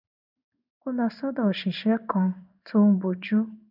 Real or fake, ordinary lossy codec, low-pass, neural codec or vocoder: fake; none; 5.4 kHz; codec, 16 kHz in and 24 kHz out, 1 kbps, XY-Tokenizer